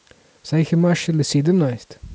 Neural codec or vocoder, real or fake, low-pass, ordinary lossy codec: none; real; none; none